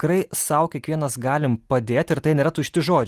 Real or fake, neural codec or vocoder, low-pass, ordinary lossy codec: real; none; 14.4 kHz; Opus, 24 kbps